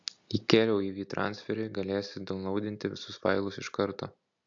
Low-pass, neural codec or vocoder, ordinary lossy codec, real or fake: 7.2 kHz; none; AAC, 64 kbps; real